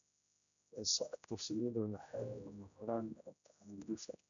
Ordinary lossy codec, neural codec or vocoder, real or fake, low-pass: none; codec, 16 kHz, 0.5 kbps, X-Codec, HuBERT features, trained on general audio; fake; 7.2 kHz